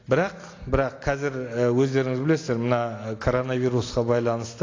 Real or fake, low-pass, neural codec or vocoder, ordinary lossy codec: real; 7.2 kHz; none; AAC, 32 kbps